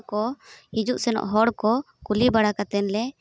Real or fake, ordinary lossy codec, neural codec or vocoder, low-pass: real; none; none; none